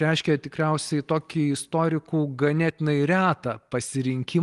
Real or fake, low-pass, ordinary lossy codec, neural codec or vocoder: real; 10.8 kHz; Opus, 24 kbps; none